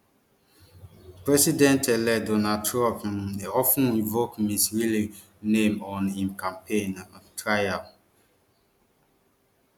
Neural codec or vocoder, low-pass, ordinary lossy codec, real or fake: none; 19.8 kHz; none; real